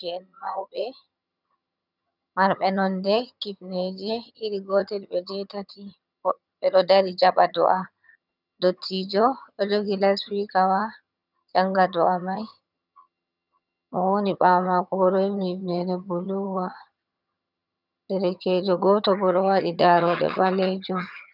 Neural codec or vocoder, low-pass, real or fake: vocoder, 22.05 kHz, 80 mel bands, HiFi-GAN; 5.4 kHz; fake